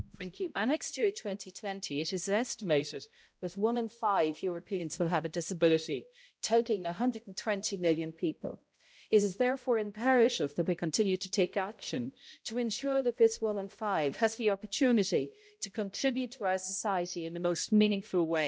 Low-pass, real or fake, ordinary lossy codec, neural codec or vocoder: none; fake; none; codec, 16 kHz, 0.5 kbps, X-Codec, HuBERT features, trained on balanced general audio